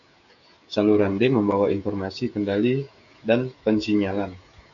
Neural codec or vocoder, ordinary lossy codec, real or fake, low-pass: codec, 16 kHz, 16 kbps, FreqCodec, smaller model; AAC, 64 kbps; fake; 7.2 kHz